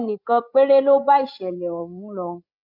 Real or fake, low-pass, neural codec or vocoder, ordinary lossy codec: fake; 5.4 kHz; codec, 16 kHz, 16 kbps, FreqCodec, larger model; none